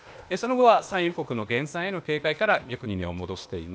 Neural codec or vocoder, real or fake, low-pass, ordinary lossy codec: codec, 16 kHz, 0.8 kbps, ZipCodec; fake; none; none